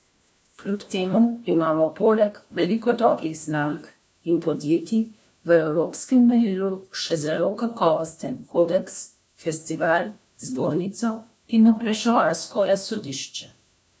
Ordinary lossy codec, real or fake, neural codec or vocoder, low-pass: none; fake; codec, 16 kHz, 1 kbps, FunCodec, trained on LibriTTS, 50 frames a second; none